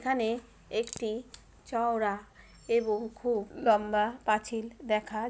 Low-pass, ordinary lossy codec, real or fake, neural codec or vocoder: none; none; real; none